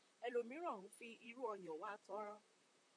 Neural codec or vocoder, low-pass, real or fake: vocoder, 44.1 kHz, 128 mel bands, Pupu-Vocoder; 9.9 kHz; fake